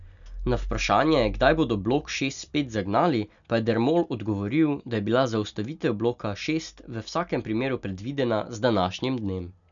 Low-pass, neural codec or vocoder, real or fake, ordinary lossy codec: 7.2 kHz; none; real; none